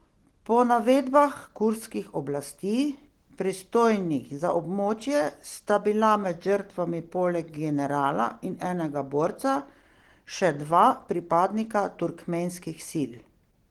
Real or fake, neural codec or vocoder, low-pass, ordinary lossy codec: real; none; 19.8 kHz; Opus, 16 kbps